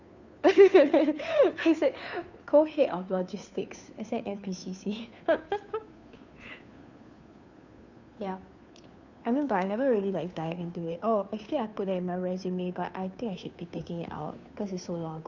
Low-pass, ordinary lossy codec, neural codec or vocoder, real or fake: 7.2 kHz; none; codec, 16 kHz, 2 kbps, FunCodec, trained on Chinese and English, 25 frames a second; fake